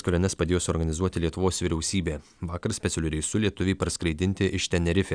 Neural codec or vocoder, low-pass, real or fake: none; 9.9 kHz; real